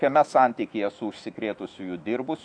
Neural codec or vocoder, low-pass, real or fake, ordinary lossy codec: autoencoder, 48 kHz, 128 numbers a frame, DAC-VAE, trained on Japanese speech; 9.9 kHz; fake; AAC, 64 kbps